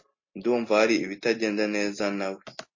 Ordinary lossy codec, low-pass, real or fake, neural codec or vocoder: MP3, 32 kbps; 7.2 kHz; real; none